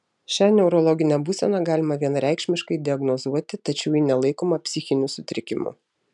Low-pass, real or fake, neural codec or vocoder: 10.8 kHz; real; none